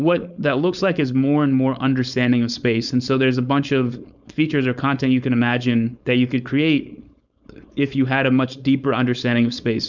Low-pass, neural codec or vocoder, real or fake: 7.2 kHz; codec, 16 kHz, 4.8 kbps, FACodec; fake